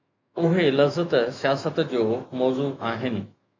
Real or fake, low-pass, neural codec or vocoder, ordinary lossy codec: fake; 7.2 kHz; autoencoder, 48 kHz, 128 numbers a frame, DAC-VAE, trained on Japanese speech; MP3, 48 kbps